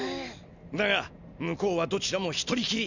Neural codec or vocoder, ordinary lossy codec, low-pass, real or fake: none; none; 7.2 kHz; real